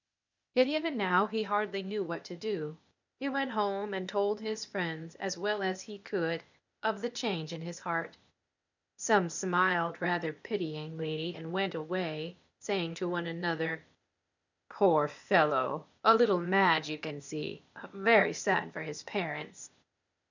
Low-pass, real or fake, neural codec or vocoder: 7.2 kHz; fake; codec, 16 kHz, 0.8 kbps, ZipCodec